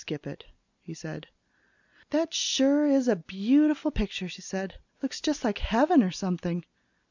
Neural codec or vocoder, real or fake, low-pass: none; real; 7.2 kHz